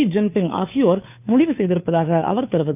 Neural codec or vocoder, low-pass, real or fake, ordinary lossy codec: codec, 16 kHz, 2 kbps, FunCodec, trained on Chinese and English, 25 frames a second; 3.6 kHz; fake; none